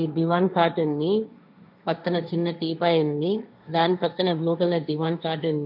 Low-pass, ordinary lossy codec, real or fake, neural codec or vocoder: 5.4 kHz; none; fake; codec, 16 kHz, 1.1 kbps, Voila-Tokenizer